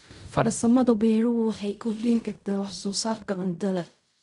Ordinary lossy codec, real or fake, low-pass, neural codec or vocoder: none; fake; 10.8 kHz; codec, 16 kHz in and 24 kHz out, 0.4 kbps, LongCat-Audio-Codec, fine tuned four codebook decoder